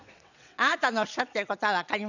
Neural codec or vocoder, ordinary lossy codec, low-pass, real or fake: vocoder, 22.05 kHz, 80 mel bands, WaveNeXt; none; 7.2 kHz; fake